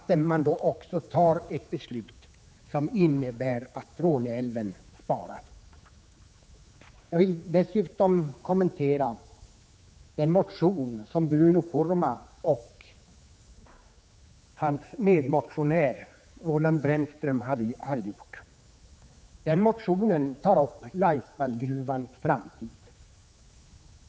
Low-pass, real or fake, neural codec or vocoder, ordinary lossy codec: none; fake; codec, 16 kHz, 4 kbps, X-Codec, HuBERT features, trained on general audio; none